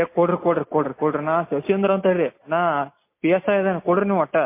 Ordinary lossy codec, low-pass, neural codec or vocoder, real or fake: MP3, 24 kbps; 3.6 kHz; none; real